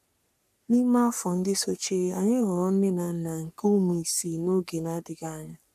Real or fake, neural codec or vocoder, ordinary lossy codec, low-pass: fake; codec, 44.1 kHz, 3.4 kbps, Pupu-Codec; none; 14.4 kHz